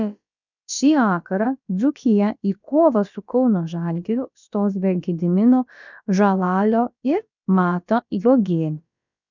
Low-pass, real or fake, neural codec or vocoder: 7.2 kHz; fake; codec, 16 kHz, about 1 kbps, DyCAST, with the encoder's durations